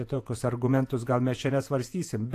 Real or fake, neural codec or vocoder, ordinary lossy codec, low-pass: fake; vocoder, 48 kHz, 128 mel bands, Vocos; AAC, 64 kbps; 14.4 kHz